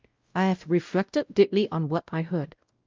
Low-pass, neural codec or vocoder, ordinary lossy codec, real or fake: 7.2 kHz; codec, 16 kHz, 0.5 kbps, X-Codec, WavLM features, trained on Multilingual LibriSpeech; Opus, 24 kbps; fake